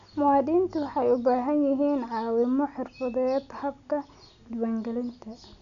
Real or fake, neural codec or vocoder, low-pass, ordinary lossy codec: real; none; 7.2 kHz; none